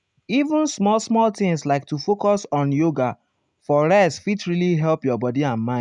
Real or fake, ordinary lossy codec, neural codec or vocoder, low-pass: real; none; none; 10.8 kHz